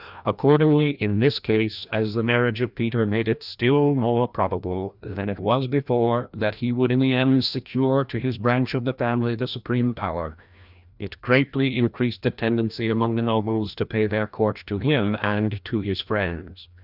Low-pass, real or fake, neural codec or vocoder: 5.4 kHz; fake; codec, 16 kHz, 1 kbps, FreqCodec, larger model